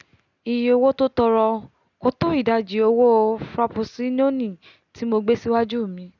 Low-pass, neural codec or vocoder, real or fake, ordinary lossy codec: 7.2 kHz; none; real; none